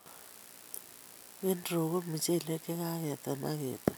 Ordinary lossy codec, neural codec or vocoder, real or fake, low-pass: none; none; real; none